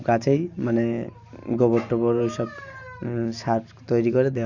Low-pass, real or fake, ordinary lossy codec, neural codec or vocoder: 7.2 kHz; real; none; none